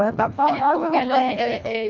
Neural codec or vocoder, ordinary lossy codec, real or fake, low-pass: codec, 24 kHz, 1.5 kbps, HILCodec; none; fake; 7.2 kHz